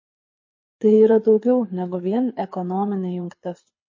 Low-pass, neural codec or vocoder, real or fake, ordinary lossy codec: 7.2 kHz; codec, 24 kHz, 6 kbps, HILCodec; fake; MP3, 32 kbps